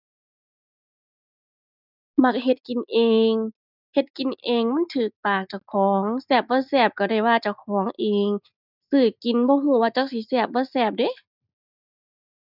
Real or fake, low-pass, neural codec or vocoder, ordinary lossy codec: real; 5.4 kHz; none; none